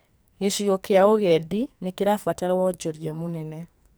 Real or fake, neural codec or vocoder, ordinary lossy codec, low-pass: fake; codec, 44.1 kHz, 2.6 kbps, SNAC; none; none